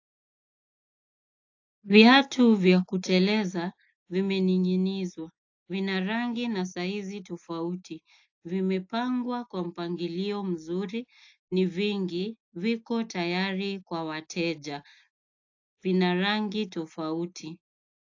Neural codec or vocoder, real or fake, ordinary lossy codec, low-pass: none; real; AAC, 48 kbps; 7.2 kHz